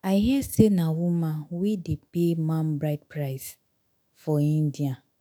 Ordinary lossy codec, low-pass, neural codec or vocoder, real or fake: none; none; autoencoder, 48 kHz, 128 numbers a frame, DAC-VAE, trained on Japanese speech; fake